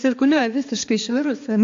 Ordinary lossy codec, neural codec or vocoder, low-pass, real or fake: AAC, 48 kbps; codec, 16 kHz, 2 kbps, X-Codec, HuBERT features, trained on balanced general audio; 7.2 kHz; fake